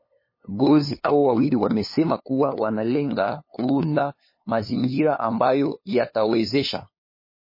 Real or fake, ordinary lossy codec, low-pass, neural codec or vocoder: fake; MP3, 24 kbps; 5.4 kHz; codec, 16 kHz, 2 kbps, FunCodec, trained on LibriTTS, 25 frames a second